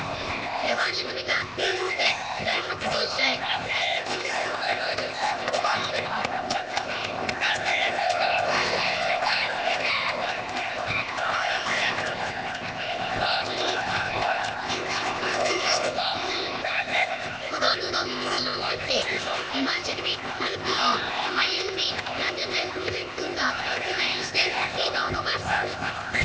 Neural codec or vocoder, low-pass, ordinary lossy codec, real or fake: codec, 16 kHz, 0.8 kbps, ZipCodec; none; none; fake